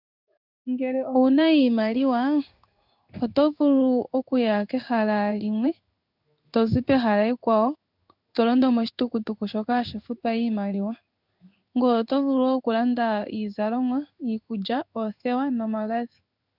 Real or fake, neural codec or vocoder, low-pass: fake; codec, 16 kHz in and 24 kHz out, 1 kbps, XY-Tokenizer; 5.4 kHz